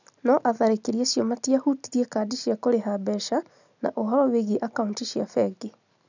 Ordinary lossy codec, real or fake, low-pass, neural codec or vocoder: none; real; 7.2 kHz; none